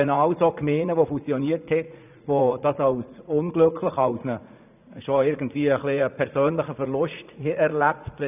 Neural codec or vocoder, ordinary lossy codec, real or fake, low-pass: vocoder, 44.1 kHz, 80 mel bands, Vocos; none; fake; 3.6 kHz